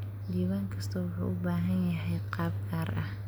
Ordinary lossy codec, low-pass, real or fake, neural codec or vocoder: none; none; real; none